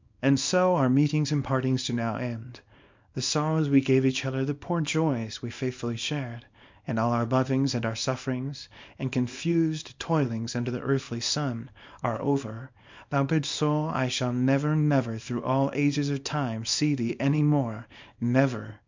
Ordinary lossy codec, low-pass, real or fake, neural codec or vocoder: MP3, 64 kbps; 7.2 kHz; fake; codec, 24 kHz, 0.9 kbps, WavTokenizer, small release